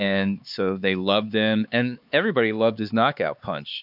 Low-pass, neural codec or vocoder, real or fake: 5.4 kHz; codec, 16 kHz, 4 kbps, X-Codec, HuBERT features, trained on LibriSpeech; fake